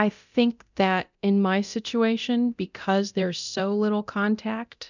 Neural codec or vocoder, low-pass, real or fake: codec, 24 kHz, 0.9 kbps, DualCodec; 7.2 kHz; fake